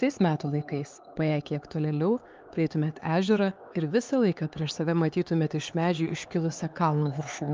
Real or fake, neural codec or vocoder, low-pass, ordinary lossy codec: fake; codec, 16 kHz, 4 kbps, X-Codec, HuBERT features, trained on LibriSpeech; 7.2 kHz; Opus, 32 kbps